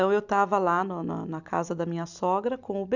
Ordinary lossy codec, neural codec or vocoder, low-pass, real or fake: none; none; 7.2 kHz; real